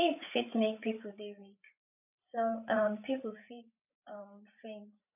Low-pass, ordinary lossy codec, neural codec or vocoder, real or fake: 3.6 kHz; none; codec, 16 kHz, 8 kbps, FreqCodec, larger model; fake